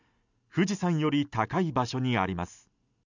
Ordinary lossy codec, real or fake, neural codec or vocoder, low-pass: none; real; none; 7.2 kHz